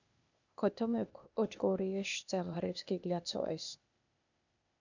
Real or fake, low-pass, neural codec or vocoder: fake; 7.2 kHz; codec, 16 kHz, 0.8 kbps, ZipCodec